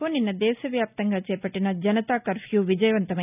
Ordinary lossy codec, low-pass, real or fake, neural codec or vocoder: none; 3.6 kHz; real; none